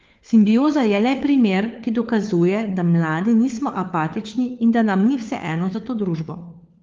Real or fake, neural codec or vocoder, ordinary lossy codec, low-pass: fake; codec, 16 kHz, 4 kbps, FunCodec, trained on LibriTTS, 50 frames a second; Opus, 32 kbps; 7.2 kHz